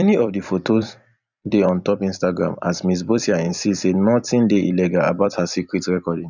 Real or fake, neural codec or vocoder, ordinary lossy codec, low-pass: fake; vocoder, 44.1 kHz, 128 mel bands every 256 samples, BigVGAN v2; none; 7.2 kHz